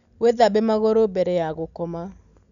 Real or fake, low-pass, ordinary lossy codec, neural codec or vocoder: real; 7.2 kHz; none; none